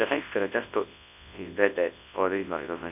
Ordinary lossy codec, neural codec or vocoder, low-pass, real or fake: none; codec, 24 kHz, 0.9 kbps, WavTokenizer, large speech release; 3.6 kHz; fake